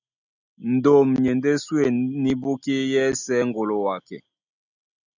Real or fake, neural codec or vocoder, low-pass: real; none; 7.2 kHz